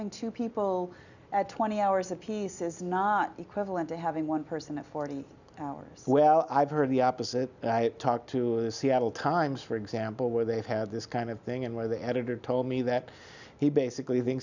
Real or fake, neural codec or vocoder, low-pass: real; none; 7.2 kHz